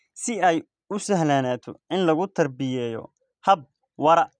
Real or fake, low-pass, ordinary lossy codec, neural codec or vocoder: real; 9.9 kHz; none; none